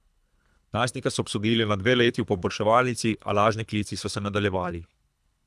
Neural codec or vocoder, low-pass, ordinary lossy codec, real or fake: codec, 24 kHz, 3 kbps, HILCodec; none; none; fake